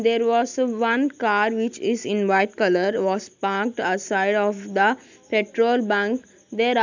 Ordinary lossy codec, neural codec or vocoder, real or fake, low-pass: none; none; real; 7.2 kHz